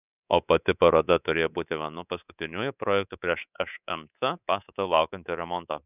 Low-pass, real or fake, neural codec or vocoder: 3.6 kHz; fake; codec, 24 kHz, 3.1 kbps, DualCodec